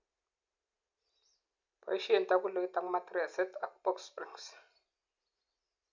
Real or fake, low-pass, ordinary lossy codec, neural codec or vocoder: real; 7.2 kHz; MP3, 64 kbps; none